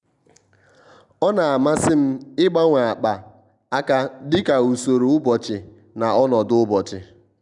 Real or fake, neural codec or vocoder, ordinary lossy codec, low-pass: real; none; none; 10.8 kHz